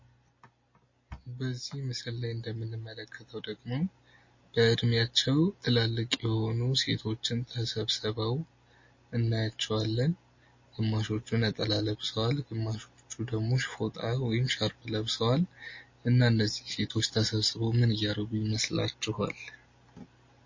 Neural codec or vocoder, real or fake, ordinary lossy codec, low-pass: none; real; MP3, 32 kbps; 7.2 kHz